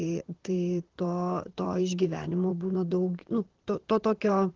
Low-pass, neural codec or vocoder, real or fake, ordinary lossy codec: 7.2 kHz; vocoder, 44.1 kHz, 128 mel bands, Pupu-Vocoder; fake; Opus, 16 kbps